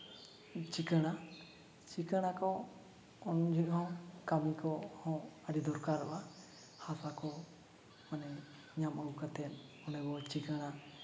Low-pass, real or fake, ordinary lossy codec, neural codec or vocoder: none; real; none; none